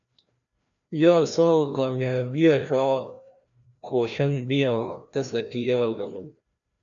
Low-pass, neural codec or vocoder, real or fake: 7.2 kHz; codec, 16 kHz, 1 kbps, FreqCodec, larger model; fake